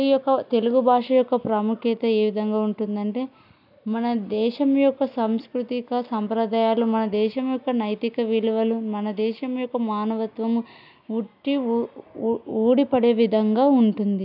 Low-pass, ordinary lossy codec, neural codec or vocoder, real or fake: 5.4 kHz; none; none; real